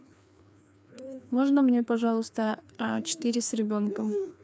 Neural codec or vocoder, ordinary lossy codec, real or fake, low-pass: codec, 16 kHz, 2 kbps, FreqCodec, larger model; none; fake; none